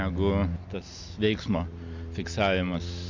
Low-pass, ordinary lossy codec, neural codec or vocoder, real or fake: 7.2 kHz; MP3, 64 kbps; none; real